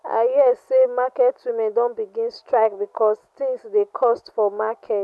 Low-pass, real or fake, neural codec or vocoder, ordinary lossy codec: none; real; none; none